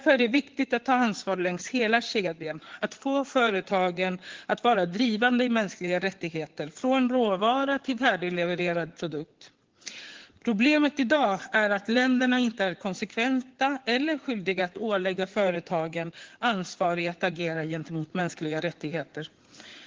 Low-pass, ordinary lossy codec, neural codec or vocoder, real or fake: 7.2 kHz; Opus, 16 kbps; codec, 16 kHz in and 24 kHz out, 2.2 kbps, FireRedTTS-2 codec; fake